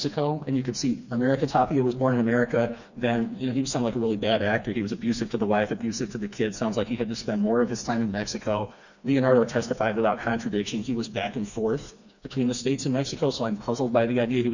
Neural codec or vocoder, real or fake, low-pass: codec, 16 kHz, 2 kbps, FreqCodec, smaller model; fake; 7.2 kHz